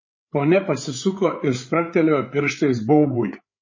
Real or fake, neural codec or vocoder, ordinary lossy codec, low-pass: fake; codec, 16 kHz, 8 kbps, FreqCodec, larger model; MP3, 32 kbps; 7.2 kHz